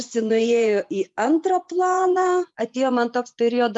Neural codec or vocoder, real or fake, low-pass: vocoder, 24 kHz, 100 mel bands, Vocos; fake; 10.8 kHz